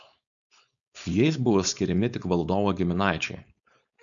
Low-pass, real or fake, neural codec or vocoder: 7.2 kHz; fake; codec, 16 kHz, 4.8 kbps, FACodec